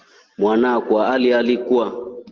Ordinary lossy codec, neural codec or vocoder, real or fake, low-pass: Opus, 16 kbps; none; real; 7.2 kHz